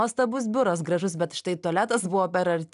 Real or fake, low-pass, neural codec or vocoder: real; 10.8 kHz; none